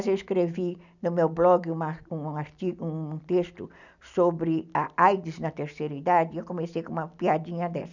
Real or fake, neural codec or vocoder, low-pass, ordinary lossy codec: real; none; 7.2 kHz; none